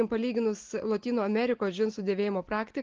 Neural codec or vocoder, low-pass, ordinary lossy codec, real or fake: none; 7.2 kHz; Opus, 16 kbps; real